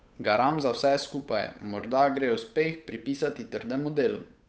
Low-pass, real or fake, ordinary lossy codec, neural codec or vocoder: none; fake; none; codec, 16 kHz, 8 kbps, FunCodec, trained on Chinese and English, 25 frames a second